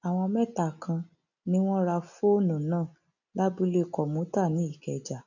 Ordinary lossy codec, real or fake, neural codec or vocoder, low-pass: none; real; none; 7.2 kHz